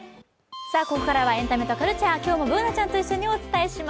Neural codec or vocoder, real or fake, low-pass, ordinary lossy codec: none; real; none; none